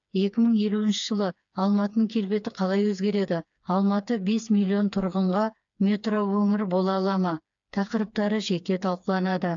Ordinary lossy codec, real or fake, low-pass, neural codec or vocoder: AAC, 64 kbps; fake; 7.2 kHz; codec, 16 kHz, 4 kbps, FreqCodec, smaller model